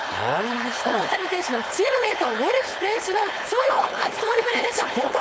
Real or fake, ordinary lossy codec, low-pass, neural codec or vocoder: fake; none; none; codec, 16 kHz, 4.8 kbps, FACodec